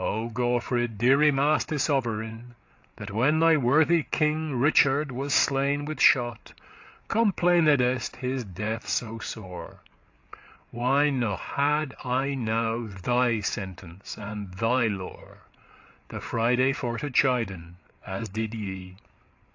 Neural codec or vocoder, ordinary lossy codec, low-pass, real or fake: codec, 16 kHz, 8 kbps, FreqCodec, larger model; AAC, 48 kbps; 7.2 kHz; fake